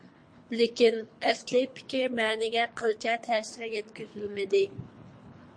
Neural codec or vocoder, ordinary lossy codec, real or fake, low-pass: codec, 24 kHz, 3 kbps, HILCodec; MP3, 48 kbps; fake; 9.9 kHz